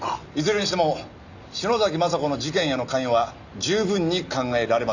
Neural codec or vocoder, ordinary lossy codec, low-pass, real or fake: none; none; 7.2 kHz; real